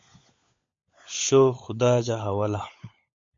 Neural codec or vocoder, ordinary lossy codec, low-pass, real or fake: codec, 16 kHz, 16 kbps, FunCodec, trained on LibriTTS, 50 frames a second; MP3, 48 kbps; 7.2 kHz; fake